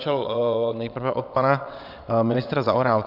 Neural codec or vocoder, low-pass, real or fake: vocoder, 22.05 kHz, 80 mel bands, WaveNeXt; 5.4 kHz; fake